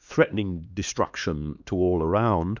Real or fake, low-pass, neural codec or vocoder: fake; 7.2 kHz; codec, 16 kHz, 2 kbps, X-Codec, HuBERT features, trained on LibriSpeech